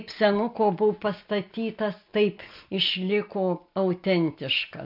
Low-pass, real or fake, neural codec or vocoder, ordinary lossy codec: 5.4 kHz; real; none; MP3, 48 kbps